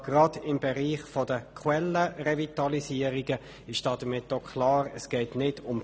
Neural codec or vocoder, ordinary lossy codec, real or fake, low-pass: none; none; real; none